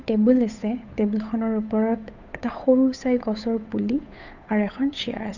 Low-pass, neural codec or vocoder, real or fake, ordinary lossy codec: 7.2 kHz; codec, 16 kHz, 8 kbps, FunCodec, trained on Chinese and English, 25 frames a second; fake; none